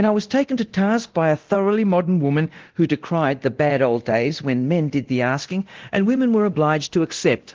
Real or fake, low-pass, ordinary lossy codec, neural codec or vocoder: fake; 7.2 kHz; Opus, 16 kbps; codec, 24 kHz, 0.9 kbps, DualCodec